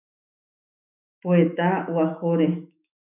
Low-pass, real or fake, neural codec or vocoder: 3.6 kHz; fake; autoencoder, 48 kHz, 128 numbers a frame, DAC-VAE, trained on Japanese speech